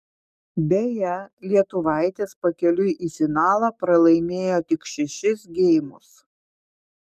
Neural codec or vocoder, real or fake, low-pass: codec, 44.1 kHz, 7.8 kbps, DAC; fake; 14.4 kHz